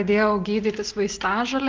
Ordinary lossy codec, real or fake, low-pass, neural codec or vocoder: Opus, 24 kbps; real; 7.2 kHz; none